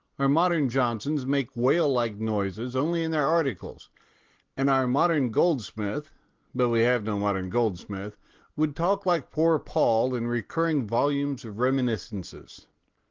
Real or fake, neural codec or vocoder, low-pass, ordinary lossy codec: real; none; 7.2 kHz; Opus, 16 kbps